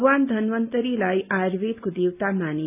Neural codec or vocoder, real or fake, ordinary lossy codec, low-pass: none; real; none; 3.6 kHz